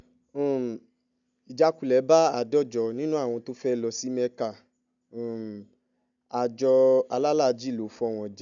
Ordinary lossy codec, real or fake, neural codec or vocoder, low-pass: none; real; none; 7.2 kHz